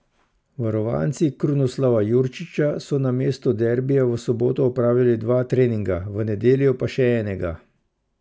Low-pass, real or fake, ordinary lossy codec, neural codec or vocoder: none; real; none; none